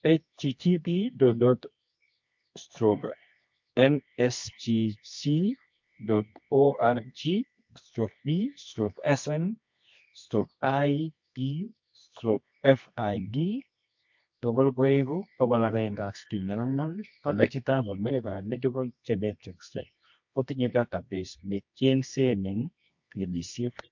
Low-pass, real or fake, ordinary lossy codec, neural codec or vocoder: 7.2 kHz; fake; MP3, 48 kbps; codec, 24 kHz, 0.9 kbps, WavTokenizer, medium music audio release